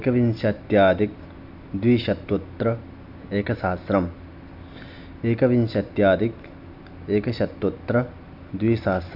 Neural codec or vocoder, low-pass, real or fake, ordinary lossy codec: none; 5.4 kHz; real; none